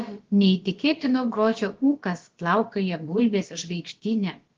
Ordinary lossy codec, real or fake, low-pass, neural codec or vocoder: Opus, 16 kbps; fake; 7.2 kHz; codec, 16 kHz, about 1 kbps, DyCAST, with the encoder's durations